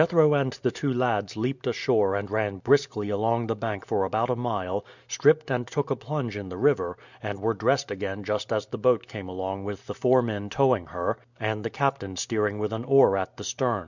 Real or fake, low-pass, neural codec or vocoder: real; 7.2 kHz; none